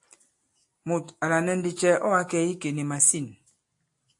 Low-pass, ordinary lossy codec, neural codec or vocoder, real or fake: 10.8 kHz; AAC, 64 kbps; none; real